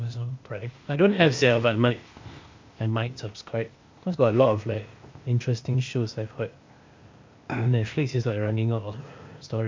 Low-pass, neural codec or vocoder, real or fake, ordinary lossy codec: 7.2 kHz; codec, 16 kHz, 0.8 kbps, ZipCodec; fake; MP3, 48 kbps